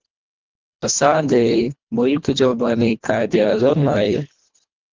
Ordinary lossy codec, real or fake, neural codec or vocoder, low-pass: Opus, 32 kbps; fake; codec, 24 kHz, 1.5 kbps, HILCodec; 7.2 kHz